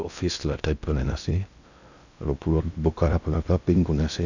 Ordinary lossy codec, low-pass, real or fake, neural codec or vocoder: none; 7.2 kHz; fake; codec, 16 kHz in and 24 kHz out, 0.6 kbps, FocalCodec, streaming, 2048 codes